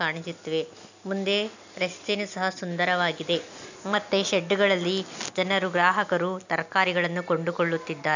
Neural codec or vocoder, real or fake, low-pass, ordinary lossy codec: none; real; 7.2 kHz; none